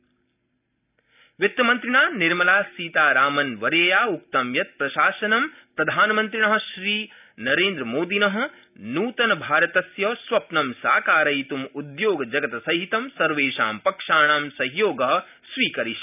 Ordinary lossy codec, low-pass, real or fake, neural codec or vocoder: none; 3.6 kHz; real; none